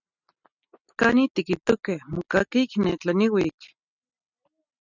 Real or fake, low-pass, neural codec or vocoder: real; 7.2 kHz; none